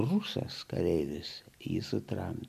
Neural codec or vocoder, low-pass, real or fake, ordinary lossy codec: none; 14.4 kHz; real; MP3, 96 kbps